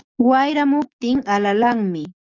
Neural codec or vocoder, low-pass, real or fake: codec, 44.1 kHz, 7.8 kbps, DAC; 7.2 kHz; fake